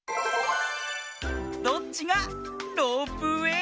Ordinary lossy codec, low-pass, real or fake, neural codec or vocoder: none; none; real; none